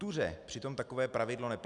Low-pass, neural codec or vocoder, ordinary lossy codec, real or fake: 10.8 kHz; none; MP3, 96 kbps; real